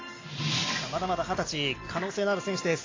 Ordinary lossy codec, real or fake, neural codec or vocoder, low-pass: AAC, 32 kbps; real; none; 7.2 kHz